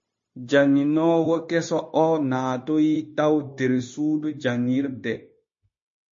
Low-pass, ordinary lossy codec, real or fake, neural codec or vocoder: 7.2 kHz; MP3, 32 kbps; fake; codec, 16 kHz, 0.9 kbps, LongCat-Audio-Codec